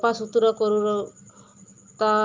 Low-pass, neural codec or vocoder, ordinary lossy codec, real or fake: 7.2 kHz; none; Opus, 24 kbps; real